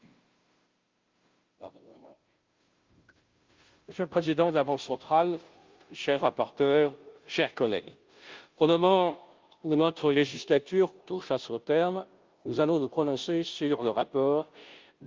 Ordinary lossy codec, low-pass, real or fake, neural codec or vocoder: Opus, 32 kbps; 7.2 kHz; fake; codec, 16 kHz, 0.5 kbps, FunCodec, trained on Chinese and English, 25 frames a second